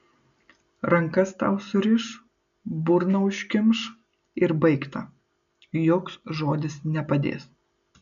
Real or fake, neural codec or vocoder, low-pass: real; none; 7.2 kHz